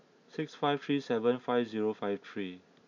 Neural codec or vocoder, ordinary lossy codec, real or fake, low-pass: none; none; real; 7.2 kHz